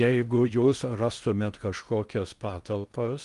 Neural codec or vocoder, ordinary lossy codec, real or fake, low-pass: codec, 16 kHz in and 24 kHz out, 0.6 kbps, FocalCodec, streaming, 4096 codes; Opus, 32 kbps; fake; 10.8 kHz